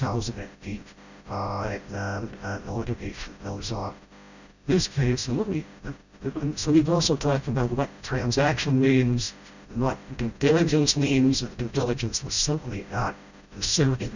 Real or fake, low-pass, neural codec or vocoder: fake; 7.2 kHz; codec, 16 kHz, 0.5 kbps, FreqCodec, smaller model